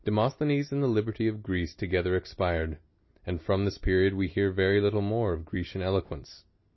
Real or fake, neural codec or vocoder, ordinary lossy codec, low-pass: real; none; MP3, 24 kbps; 7.2 kHz